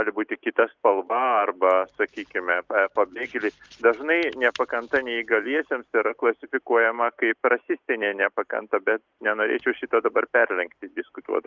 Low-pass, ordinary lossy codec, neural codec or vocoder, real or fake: 7.2 kHz; Opus, 24 kbps; none; real